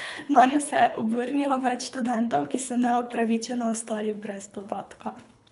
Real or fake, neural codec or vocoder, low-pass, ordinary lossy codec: fake; codec, 24 kHz, 3 kbps, HILCodec; 10.8 kHz; none